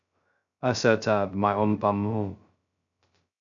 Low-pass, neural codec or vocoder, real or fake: 7.2 kHz; codec, 16 kHz, 0.2 kbps, FocalCodec; fake